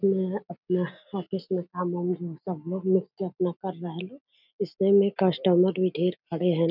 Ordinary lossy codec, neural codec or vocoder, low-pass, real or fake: none; none; 5.4 kHz; real